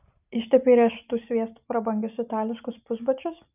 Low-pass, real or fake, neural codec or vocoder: 3.6 kHz; real; none